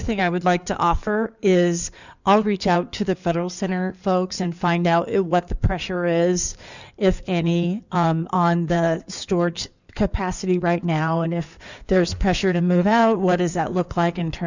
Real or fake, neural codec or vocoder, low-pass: fake; codec, 16 kHz in and 24 kHz out, 2.2 kbps, FireRedTTS-2 codec; 7.2 kHz